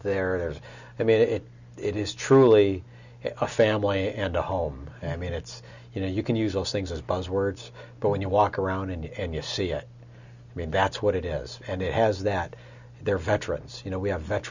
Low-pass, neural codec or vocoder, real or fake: 7.2 kHz; none; real